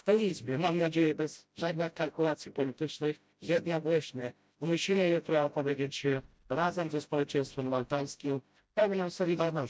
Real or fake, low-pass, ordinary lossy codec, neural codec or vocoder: fake; none; none; codec, 16 kHz, 0.5 kbps, FreqCodec, smaller model